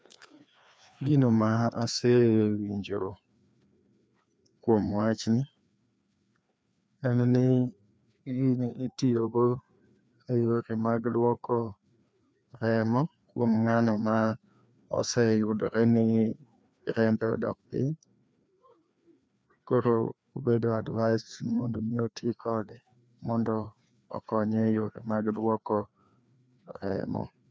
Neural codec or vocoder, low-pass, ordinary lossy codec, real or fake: codec, 16 kHz, 2 kbps, FreqCodec, larger model; none; none; fake